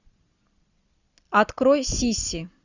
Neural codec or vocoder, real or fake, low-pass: none; real; 7.2 kHz